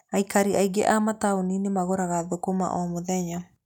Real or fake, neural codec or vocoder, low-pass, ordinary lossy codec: real; none; 19.8 kHz; none